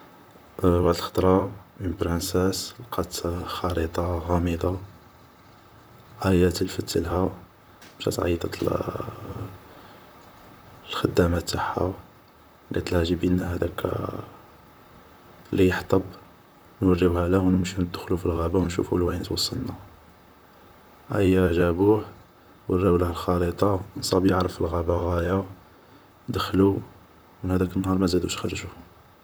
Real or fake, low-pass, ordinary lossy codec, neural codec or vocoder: fake; none; none; vocoder, 44.1 kHz, 128 mel bands, Pupu-Vocoder